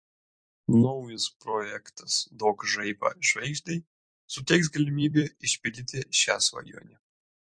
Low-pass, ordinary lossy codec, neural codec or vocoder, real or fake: 9.9 kHz; MP3, 48 kbps; vocoder, 44.1 kHz, 128 mel bands every 256 samples, BigVGAN v2; fake